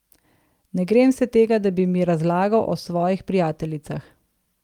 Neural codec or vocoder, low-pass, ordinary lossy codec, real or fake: none; 19.8 kHz; Opus, 32 kbps; real